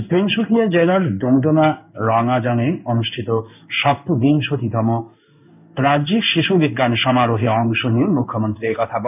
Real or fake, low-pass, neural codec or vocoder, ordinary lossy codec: fake; 3.6 kHz; codec, 16 kHz in and 24 kHz out, 1 kbps, XY-Tokenizer; none